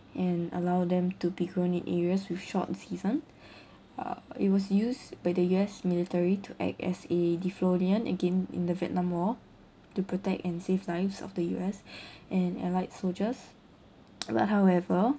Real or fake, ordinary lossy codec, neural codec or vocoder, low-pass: real; none; none; none